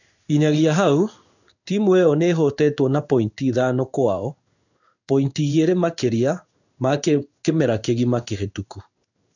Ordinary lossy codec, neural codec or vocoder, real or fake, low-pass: none; codec, 16 kHz in and 24 kHz out, 1 kbps, XY-Tokenizer; fake; 7.2 kHz